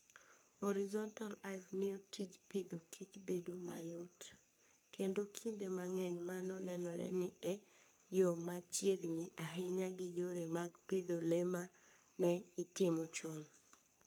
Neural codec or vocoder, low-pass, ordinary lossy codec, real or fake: codec, 44.1 kHz, 3.4 kbps, Pupu-Codec; none; none; fake